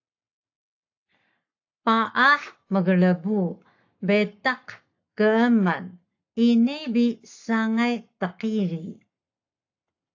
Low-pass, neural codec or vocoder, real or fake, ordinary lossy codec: 7.2 kHz; codec, 44.1 kHz, 7.8 kbps, Pupu-Codec; fake; AAC, 48 kbps